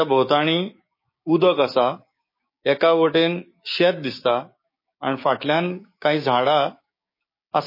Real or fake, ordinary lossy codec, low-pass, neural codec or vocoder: fake; MP3, 24 kbps; 5.4 kHz; codec, 16 kHz, 6 kbps, DAC